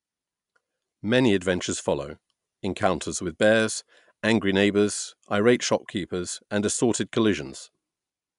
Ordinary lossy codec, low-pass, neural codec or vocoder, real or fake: MP3, 96 kbps; 10.8 kHz; none; real